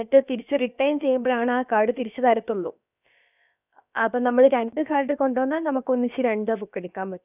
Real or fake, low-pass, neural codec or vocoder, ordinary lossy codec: fake; 3.6 kHz; codec, 16 kHz, about 1 kbps, DyCAST, with the encoder's durations; none